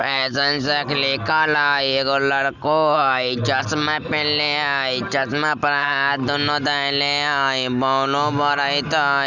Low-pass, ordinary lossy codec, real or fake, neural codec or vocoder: 7.2 kHz; none; real; none